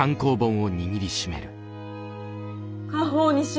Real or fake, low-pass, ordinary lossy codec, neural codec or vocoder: real; none; none; none